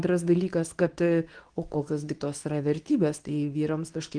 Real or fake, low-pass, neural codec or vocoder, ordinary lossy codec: fake; 9.9 kHz; codec, 24 kHz, 0.9 kbps, WavTokenizer, medium speech release version 2; Opus, 24 kbps